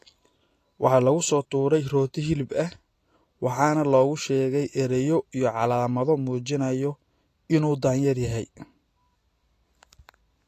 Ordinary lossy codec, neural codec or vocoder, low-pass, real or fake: AAC, 48 kbps; none; 14.4 kHz; real